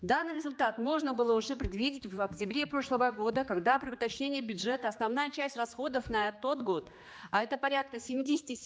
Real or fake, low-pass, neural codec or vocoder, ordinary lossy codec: fake; none; codec, 16 kHz, 2 kbps, X-Codec, HuBERT features, trained on general audio; none